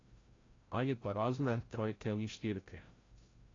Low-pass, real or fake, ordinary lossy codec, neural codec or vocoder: 7.2 kHz; fake; AAC, 32 kbps; codec, 16 kHz, 0.5 kbps, FreqCodec, larger model